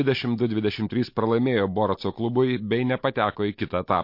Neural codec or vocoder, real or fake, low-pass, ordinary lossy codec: none; real; 5.4 kHz; MP3, 32 kbps